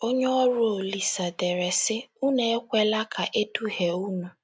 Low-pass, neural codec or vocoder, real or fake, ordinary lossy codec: none; none; real; none